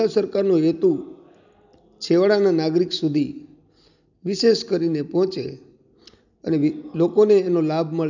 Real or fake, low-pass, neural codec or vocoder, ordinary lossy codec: real; 7.2 kHz; none; none